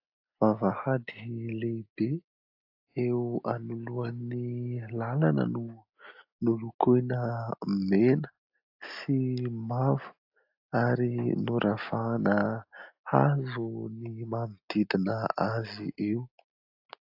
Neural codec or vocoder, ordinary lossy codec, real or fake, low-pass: none; AAC, 48 kbps; real; 5.4 kHz